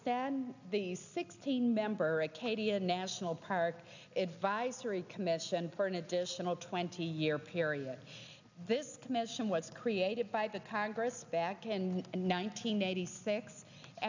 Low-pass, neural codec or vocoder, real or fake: 7.2 kHz; none; real